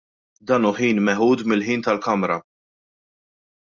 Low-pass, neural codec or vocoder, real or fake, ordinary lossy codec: 7.2 kHz; none; real; Opus, 64 kbps